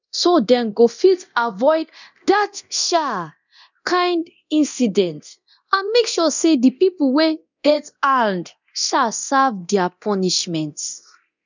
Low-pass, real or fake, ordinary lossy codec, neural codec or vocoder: 7.2 kHz; fake; none; codec, 24 kHz, 0.9 kbps, DualCodec